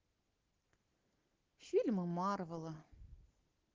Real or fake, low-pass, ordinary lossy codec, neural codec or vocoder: real; 7.2 kHz; Opus, 16 kbps; none